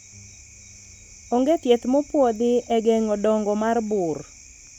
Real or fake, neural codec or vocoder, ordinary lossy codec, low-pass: real; none; none; 19.8 kHz